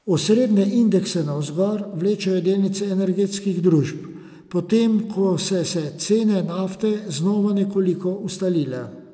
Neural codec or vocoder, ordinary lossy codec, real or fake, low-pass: none; none; real; none